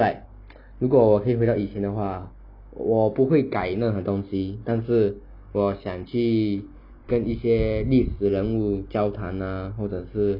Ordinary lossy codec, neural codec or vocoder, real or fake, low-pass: none; none; real; 5.4 kHz